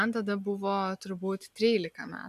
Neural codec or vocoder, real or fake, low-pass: none; real; 14.4 kHz